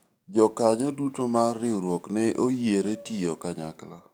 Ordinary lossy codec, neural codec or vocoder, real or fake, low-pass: none; codec, 44.1 kHz, 7.8 kbps, DAC; fake; none